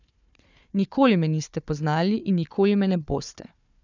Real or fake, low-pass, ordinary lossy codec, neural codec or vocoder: fake; 7.2 kHz; none; codec, 16 kHz, 4 kbps, FunCodec, trained on Chinese and English, 50 frames a second